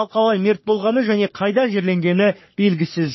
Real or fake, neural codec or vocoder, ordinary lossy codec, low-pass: fake; codec, 44.1 kHz, 3.4 kbps, Pupu-Codec; MP3, 24 kbps; 7.2 kHz